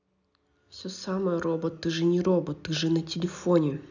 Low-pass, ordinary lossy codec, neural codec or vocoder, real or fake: 7.2 kHz; none; none; real